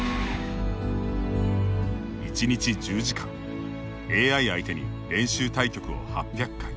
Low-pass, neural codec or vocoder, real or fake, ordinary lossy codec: none; none; real; none